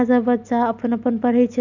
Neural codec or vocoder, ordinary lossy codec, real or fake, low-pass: none; none; real; 7.2 kHz